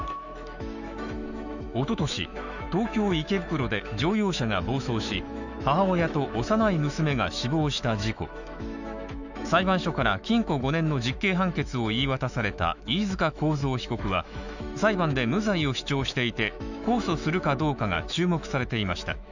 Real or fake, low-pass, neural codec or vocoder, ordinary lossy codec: fake; 7.2 kHz; autoencoder, 48 kHz, 128 numbers a frame, DAC-VAE, trained on Japanese speech; none